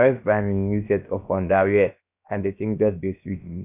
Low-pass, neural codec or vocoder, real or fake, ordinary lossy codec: 3.6 kHz; codec, 16 kHz, about 1 kbps, DyCAST, with the encoder's durations; fake; none